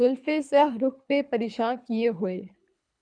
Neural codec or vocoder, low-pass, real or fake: codec, 24 kHz, 3 kbps, HILCodec; 9.9 kHz; fake